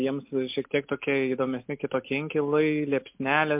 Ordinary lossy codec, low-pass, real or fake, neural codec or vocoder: MP3, 32 kbps; 3.6 kHz; real; none